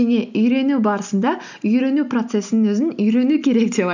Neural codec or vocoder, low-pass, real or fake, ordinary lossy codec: none; 7.2 kHz; real; none